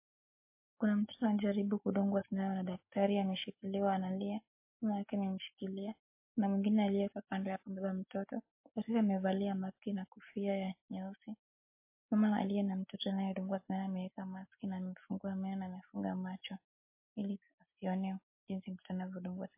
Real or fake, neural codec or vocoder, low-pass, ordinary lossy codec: real; none; 3.6 kHz; MP3, 24 kbps